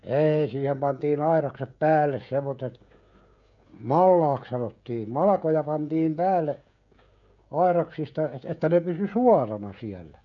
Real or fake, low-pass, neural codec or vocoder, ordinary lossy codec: fake; 7.2 kHz; codec, 16 kHz, 16 kbps, FreqCodec, smaller model; none